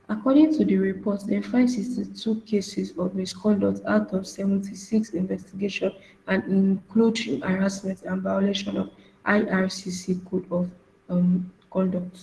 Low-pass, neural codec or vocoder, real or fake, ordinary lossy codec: 10.8 kHz; none; real; Opus, 16 kbps